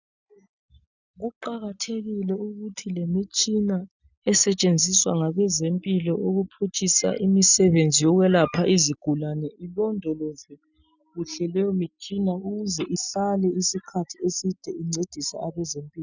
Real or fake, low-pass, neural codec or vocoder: real; 7.2 kHz; none